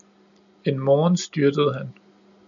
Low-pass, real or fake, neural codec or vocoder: 7.2 kHz; real; none